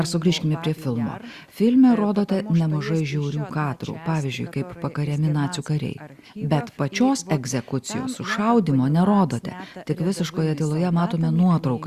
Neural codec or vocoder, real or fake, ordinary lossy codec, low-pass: none; real; Opus, 64 kbps; 14.4 kHz